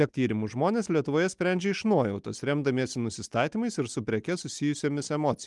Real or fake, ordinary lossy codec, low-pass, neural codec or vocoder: real; Opus, 32 kbps; 10.8 kHz; none